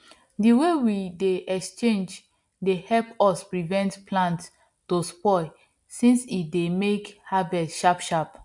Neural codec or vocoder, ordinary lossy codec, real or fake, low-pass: none; MP3, 64 kbps; real; 10.8 kHz